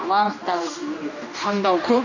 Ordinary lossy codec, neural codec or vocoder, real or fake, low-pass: none; codec, 16 kHz, 1 kbps, X-Codec, HuBERT features, trained on general audio; fake; 7.2 kHz